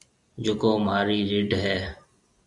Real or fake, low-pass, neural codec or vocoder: real; 10.8 kHz; none